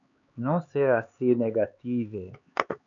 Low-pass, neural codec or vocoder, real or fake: 7.2 kHz; codec, 16 kHz, 4 kbps, X-Codec, HuBERT features, trained on LibriSpeech; fake